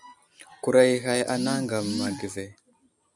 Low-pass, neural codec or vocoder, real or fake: 10.8 kHz; none; real